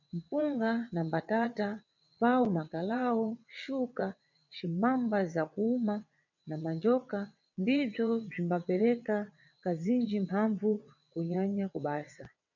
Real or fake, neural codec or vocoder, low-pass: fake; vocoder, 22.05 kHz, 80 mel bands, WaveNeXt; 7.2 kHz